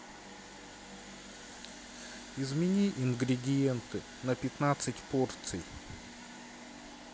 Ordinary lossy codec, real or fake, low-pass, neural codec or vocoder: none; real; none; none